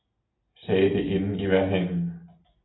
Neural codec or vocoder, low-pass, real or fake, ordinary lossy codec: none; 7.2 kHz; real; AAC, 16 kbps